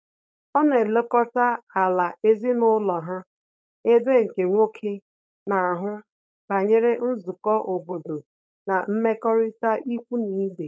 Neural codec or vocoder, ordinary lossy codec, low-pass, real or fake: codec, 16 kHz, 4.8 kbps, FACodec; none; none; fake